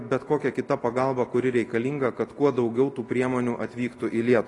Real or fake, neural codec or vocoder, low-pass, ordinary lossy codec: real; none; 10.8 kHz; AAC, 32 kbps